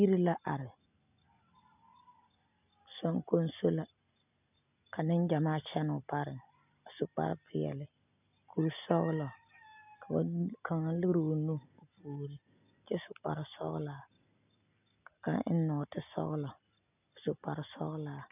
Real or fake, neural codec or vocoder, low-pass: real; none; 3.6 kHz